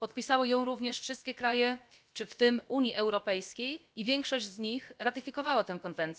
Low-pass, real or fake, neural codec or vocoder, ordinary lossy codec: none; fake; codec, 16 kHz, about 1 kbps, DyCAST, with the encoder's durations; none